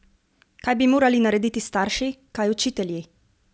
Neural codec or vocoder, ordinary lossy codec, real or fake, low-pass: none; none; real; none